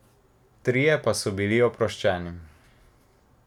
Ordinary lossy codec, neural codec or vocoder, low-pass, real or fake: none; none; 19.8 kHz; real